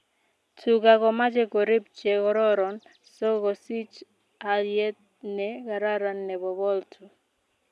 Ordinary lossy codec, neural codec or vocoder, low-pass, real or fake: none; none; none; real